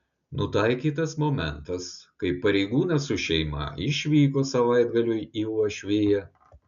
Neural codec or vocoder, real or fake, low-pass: none; real; 7.2 kHz